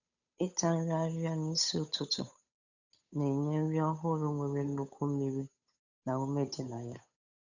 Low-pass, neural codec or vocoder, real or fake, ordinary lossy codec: 7.2 kHz; codec, 16 kHz, 8 kbps, FunCodec, trained on Chinese and English, 25 frames a second; fake; none